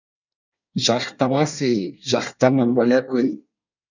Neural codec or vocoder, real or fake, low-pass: codec, 24 kHz, 1 kbps, SNAC; fake; 7.2 kHz